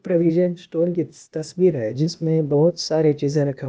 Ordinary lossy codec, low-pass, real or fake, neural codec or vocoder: none; none; fake; codec, 16 kHz, 0.8 kbps, ZipCodec